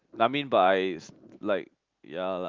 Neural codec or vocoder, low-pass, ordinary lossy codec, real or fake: none; 7.2 kHz; Opus, 24 kbps; real